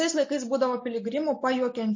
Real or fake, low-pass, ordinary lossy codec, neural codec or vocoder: real; 7.2 kHz; MP3, 32 kbps; none